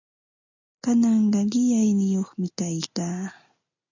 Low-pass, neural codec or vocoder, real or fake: 7.2 kHz; none; real